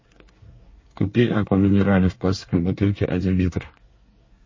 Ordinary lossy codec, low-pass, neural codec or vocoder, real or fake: MP3, 32 kbps; 7.2 kHz; codec, 24 kHz, 1 kbps, SNAC; fake